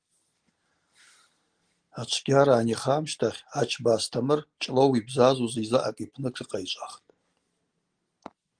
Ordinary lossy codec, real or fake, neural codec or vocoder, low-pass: Opus, 24 kbps; real; none; 9.9 kHz